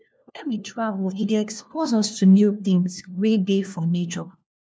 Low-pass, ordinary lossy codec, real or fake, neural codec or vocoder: none; none; fake; codec, 16 kHz, 1 kbps, FunCodec, trained on LibriTTS, 50 frames a second